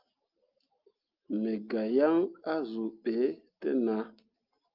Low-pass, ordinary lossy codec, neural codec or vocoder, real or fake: 5.4 kHz; Opus, 24 kbps; none; real